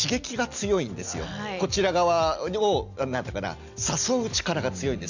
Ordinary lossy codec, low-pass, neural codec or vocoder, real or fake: none; 7.2 kHz; none; real